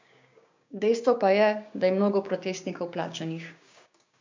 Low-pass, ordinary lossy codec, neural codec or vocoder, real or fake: 7.2 kHz; MP3, 64 kbps; codec, 16 kHz, 6 kbps, DAC; fake